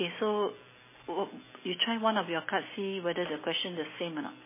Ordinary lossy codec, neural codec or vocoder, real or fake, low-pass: MP3, 16 kbps; none; real; 3.6 kHz